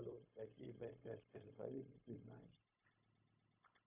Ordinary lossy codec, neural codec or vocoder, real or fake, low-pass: AAC, 32 kbps; codec, 16 kHz, 0.4 kbps, LongCat-Audio-Codec; fake; 3.6 kHz